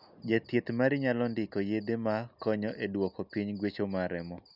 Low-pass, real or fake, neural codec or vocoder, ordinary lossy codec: 5.4 kHz; real; none; none